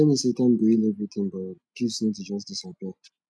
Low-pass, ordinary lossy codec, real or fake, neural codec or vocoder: none; none; real; none